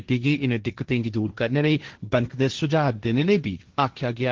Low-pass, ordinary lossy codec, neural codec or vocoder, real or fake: 7.2 kHz; Opus, 16 kbps; codec, 16 kHz, 1.1 kbps, Voila-Tokenizer; fake